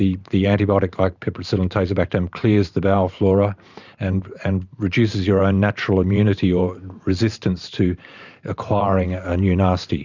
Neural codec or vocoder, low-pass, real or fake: vocoder, 44.1 kHz, 128 mel bands every 512 samples, BigVGAN v2; 7.2 kHz; fake